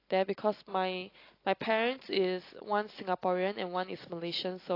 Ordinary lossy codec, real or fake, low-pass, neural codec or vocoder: AAC, 32 kbps; real; 5.4 kHz; none